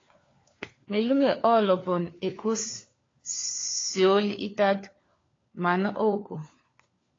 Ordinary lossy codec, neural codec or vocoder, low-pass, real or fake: AAC, 32 kbps; codec, 16 kHz, 4 kbps, FunCodec, trained on LibriTTS, 50 frames a second; 7.2 kHz; fake